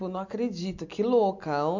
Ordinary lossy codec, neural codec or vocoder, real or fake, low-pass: none; none; real; 7.2 kHz